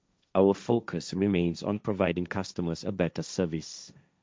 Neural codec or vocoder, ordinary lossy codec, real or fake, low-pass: codec, 16 kHz, 1.1 kbps, Voila-Tokenizer; none; fake; none